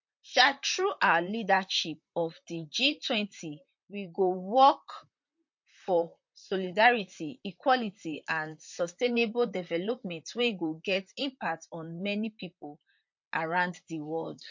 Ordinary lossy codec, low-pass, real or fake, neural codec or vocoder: MP3, 48 kbps; 7.2 kHz; fake; vocoder, 44.1 kHz, 128 mel bands, Pupu-Vocoder